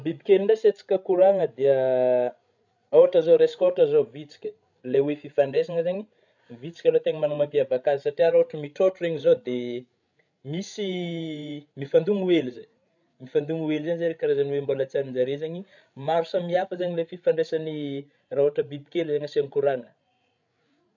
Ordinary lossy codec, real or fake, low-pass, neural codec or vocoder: none; fake; 7.2 kHz; codec, 16 kHz, 16 kbps, FreqCodec, larger model